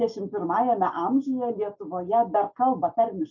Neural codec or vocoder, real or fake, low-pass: none; real; 7.2 kHz